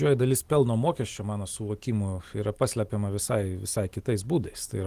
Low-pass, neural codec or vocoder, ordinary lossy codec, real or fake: 14.4 kHz; vocoder, 44.1 kHz, 128 mel bands every 256 samples, BigVGAN v2; Opus, 24 kbps; fake